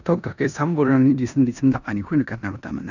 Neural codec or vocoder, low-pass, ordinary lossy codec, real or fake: codec, 16 kHz in and 24 kHz out, 0.9 kbps, LongCat-Audio-Codec, four codebook decoder; 7.2 kHz; none; fake